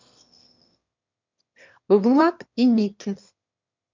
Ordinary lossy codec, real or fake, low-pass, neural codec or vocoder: MP3, 64 kbps; fake; 7.2 kHz; autoencoder, 22.05 kHz, a latent of 192 numbers a frame, VITS, trained on one speaker